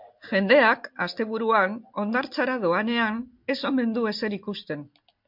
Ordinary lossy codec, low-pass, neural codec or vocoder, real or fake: MP3, 48 kbps; 5.4 kHz; none; real